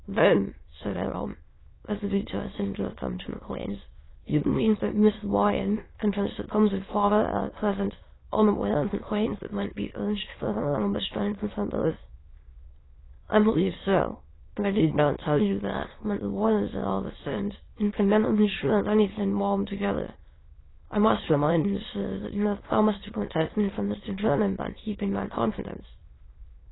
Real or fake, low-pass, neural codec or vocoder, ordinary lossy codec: fake; 7.2 kHz; autoencoder, 22.05 kHz, a latent of 192 numbers a frame, VITS, trained on many speakers; AAC, 16 kbps